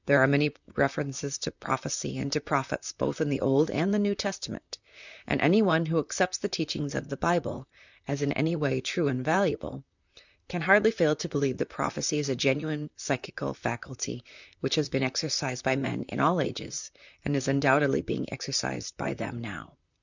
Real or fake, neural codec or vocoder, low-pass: fake; vocoder, 44.1 kHz, 128 mel bands, Pupu-Vocoder; 7.2 kHz